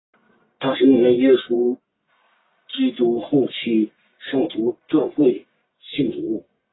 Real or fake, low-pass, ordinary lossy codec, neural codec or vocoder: fake; 7.2 kHz; AAC, 16 kbps; codec, 44.1 kHz, 1.7 kbps, Pupu-Codec